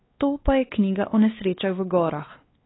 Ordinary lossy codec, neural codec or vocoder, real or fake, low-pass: AAC, 16 kbps; codec, 16 kHz, 4 kbps, X-Codec, WavLM features, trained on Multilingual LibriSpeech; fake; 7.2 kHz